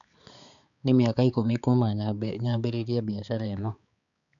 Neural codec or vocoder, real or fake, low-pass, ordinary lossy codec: codec, 16 kHz, 4 kbps, X-Codec, HuBERT features, trained on balanced general audio; fake; 7.2 kHz; none